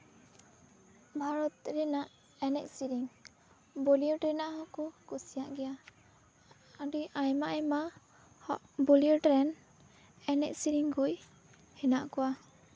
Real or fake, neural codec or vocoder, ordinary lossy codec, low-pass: real; none; none; none